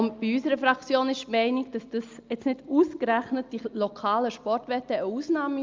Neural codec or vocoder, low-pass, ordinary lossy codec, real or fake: none; 7.2 kHz; Opus, 24 kbps; real